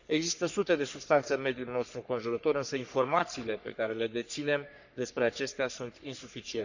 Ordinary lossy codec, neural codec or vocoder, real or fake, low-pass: none; codec, 44.1 kHz, 3.4 kbps, Pupu-Codec; fake; 7.2 kHz